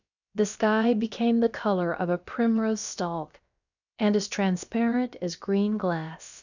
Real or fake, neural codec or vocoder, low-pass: fake; codec, 16 kHz, about 1 kbps, DyCAST, with the encoder's durations; 7.2 kHz